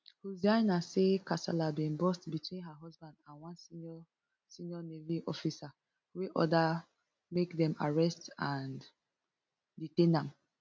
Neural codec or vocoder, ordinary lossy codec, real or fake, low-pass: none; none; real; none